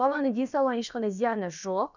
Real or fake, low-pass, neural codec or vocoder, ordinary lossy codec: fake; 7.2 kHz; codec, 16 kHz, about 1 kbps, DyCAST, with the encoder's durations; none